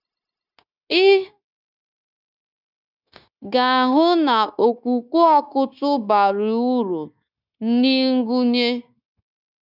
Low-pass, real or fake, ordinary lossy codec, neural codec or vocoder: 5.4 kHz; fake; none; codec, 16 kHz, 0.9 kbps, LongCat-Audio-Codec